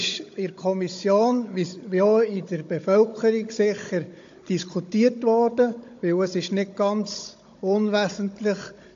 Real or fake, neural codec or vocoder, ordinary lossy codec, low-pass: fake; codec, 16 kHz, 16 kbps, FunCodec, trained on Chinese and English, 50 frames a second; AAC, 48 kbps; 7.2 kHz